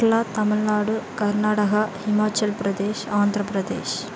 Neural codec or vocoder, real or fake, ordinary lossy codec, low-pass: none; real; none; none